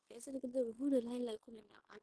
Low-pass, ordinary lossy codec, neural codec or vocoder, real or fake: 10.8 kHz; Opus, 16 kbps; codec, 16 kHz in and 24 kHz out, 0.9 kbps, LongCat-Audio-Codec, fine tuned four codebook decoder; fake